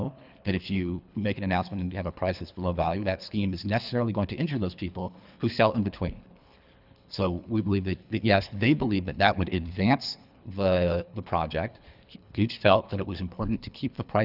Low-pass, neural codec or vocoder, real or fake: 5.4 kHz; codec, 24 kHz, 3 kbps, HILCodec; fake